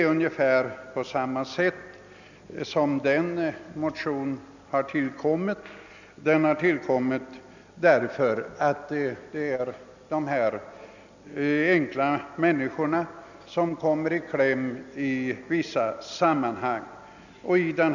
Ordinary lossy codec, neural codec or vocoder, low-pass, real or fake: none; none; 7.2 kHz; real